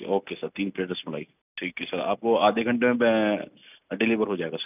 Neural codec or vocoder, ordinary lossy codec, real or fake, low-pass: none; none; real; 3.6 kHz